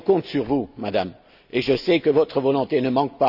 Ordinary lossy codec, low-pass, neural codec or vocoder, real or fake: none; 5.4 kHz; none; real